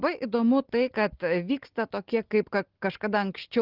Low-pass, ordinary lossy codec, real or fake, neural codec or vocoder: 5.4 kHz; Opus, 16 kbps; real; none